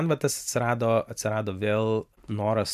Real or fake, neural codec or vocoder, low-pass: fake; vocoder, 44.1 kHz, 128 mel bands every 512 samples, BigVGAN v2; 14.4 kHz